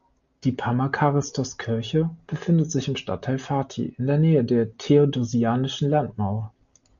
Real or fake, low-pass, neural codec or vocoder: real; 7.2 kHz; none